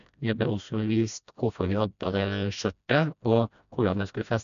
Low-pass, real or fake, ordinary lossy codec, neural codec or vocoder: 7.2 kHz; fake; none; codec, 16 kHz, 1 kbps, FreqCodec, smaller model